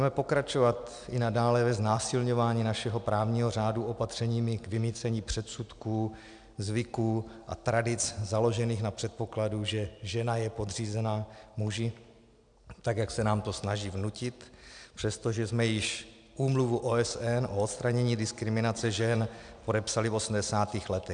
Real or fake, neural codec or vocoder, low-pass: real; none; 9.9 kHz